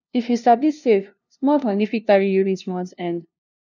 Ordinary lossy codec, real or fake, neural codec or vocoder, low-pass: none; fake; codec, 16 kHz, 0.5 kbps, FunCodec, trained on LibriTTS, 25 frames a second; 7.2 kHz